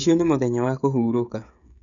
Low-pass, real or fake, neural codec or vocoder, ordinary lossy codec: 7.2 kHz; fake; codec, 16 kHz, 16 kbps, FreqCodec, smaller model; none